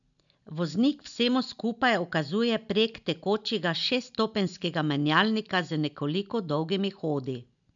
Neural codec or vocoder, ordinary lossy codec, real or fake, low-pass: none; none; real; 7.2 kHz